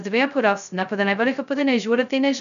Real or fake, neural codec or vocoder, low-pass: fake; codec, 16 kHz, 0.2 kbps, FocalCodec; 7.2 kHz